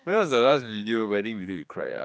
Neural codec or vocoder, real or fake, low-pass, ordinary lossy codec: codec, 16 kHz, 2 kbps, X-Codec, HuBERT features, trained on general audio; fake; none; none